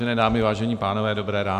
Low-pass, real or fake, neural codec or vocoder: 14.4 kHz; real; none